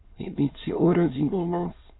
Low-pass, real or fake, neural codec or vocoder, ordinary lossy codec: 7.2 kHz; fake; autoencoder, 22.05 kHz, a latent of 192 numbers a frame, VITS, trained on many speakers; AAC, 16 kbps